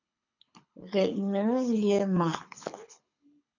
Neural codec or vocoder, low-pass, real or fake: codec, 24 kHz, 6 kbps, HILCodec; 7.2 kHz; fake